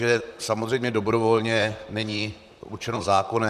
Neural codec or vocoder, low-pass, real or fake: vocoder, 44.1 kHz, 128 mel bands, Pupu-Vocoder; 14.4 kHz; fake